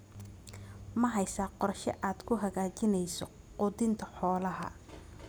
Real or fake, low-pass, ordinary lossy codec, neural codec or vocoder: real; none; none; none